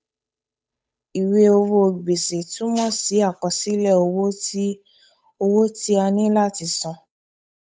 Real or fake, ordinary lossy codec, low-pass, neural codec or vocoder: fake; none; none; codec, 16 kHz, 8 kbps, FunCodec, trained on Chinese and English, 25 frames a second